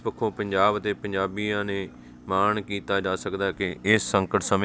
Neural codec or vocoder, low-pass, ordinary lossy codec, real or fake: none; none; none; real